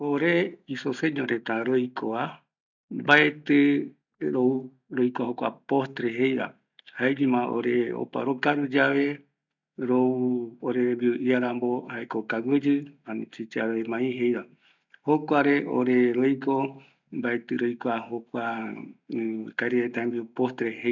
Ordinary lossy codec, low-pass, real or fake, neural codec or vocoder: none; 7.2 kHz; real; none